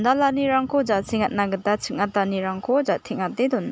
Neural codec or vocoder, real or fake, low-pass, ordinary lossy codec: none; real; none; none